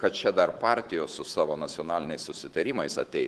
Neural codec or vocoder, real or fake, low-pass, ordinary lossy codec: codec, 24 kHz, 3.1 kbps, DualCodec; fake; 10.8 kHz; Opus, 16 kbps